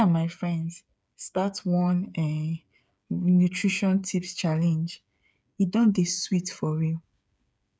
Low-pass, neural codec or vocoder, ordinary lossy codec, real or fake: none; codec, 16 kHz, 8 kbps, FreqCodec, smaller model; none; fake